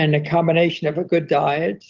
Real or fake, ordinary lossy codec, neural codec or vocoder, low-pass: real; Opus, 16 kbps; none; 7.2 kHz